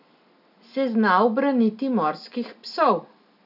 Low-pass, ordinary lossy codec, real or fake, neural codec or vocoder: 5.4 kHz; none; real; none